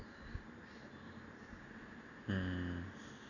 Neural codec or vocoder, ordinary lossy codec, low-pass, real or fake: none; none; 7.2 kHz; real